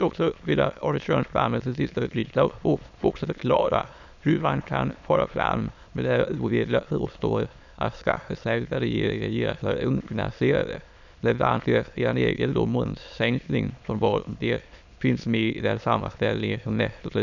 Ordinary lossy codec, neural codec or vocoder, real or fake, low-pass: none; autoencoder, 22.05 kHz, a latent of 192 numbers a frame, VITS, trained on many speakers; fake; 7.2 kHz